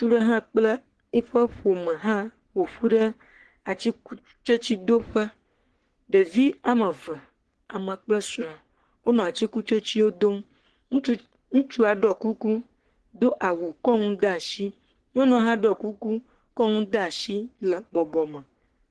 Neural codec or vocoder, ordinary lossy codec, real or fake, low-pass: codec, 44.1 kHz, 3.4 kbps, Pupu-Codec; Opus, 16 kbps; fake; 10.8 kHz